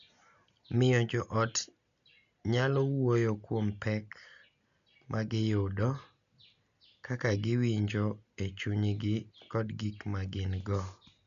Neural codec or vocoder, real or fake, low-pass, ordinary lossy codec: none; real; 7.2 kHz; none